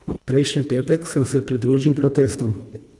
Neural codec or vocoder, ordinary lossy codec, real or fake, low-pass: codec, 24 kHz, 1.5 kbps, HILCodec; none; fake; none